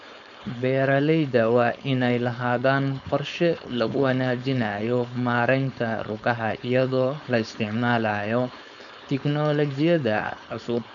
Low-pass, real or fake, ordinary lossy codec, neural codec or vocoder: 7.2 kHz; fake; none; codec, 16 kHz, 4.8 kbps, FACodec